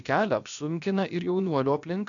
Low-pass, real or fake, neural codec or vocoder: 7.2 kHz; fake; codec, 16 kHz, about 1 kbps, DyCAST, with the encoder's durations